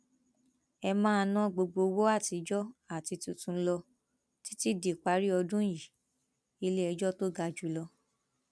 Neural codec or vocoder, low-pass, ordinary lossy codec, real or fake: none; none; none; real